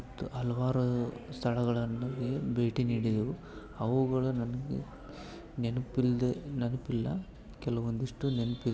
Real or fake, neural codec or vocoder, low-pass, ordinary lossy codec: real; none; none; none